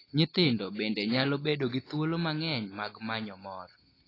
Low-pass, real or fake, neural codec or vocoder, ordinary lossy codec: 5.4 kHz; real; none; AAC, 24 kbps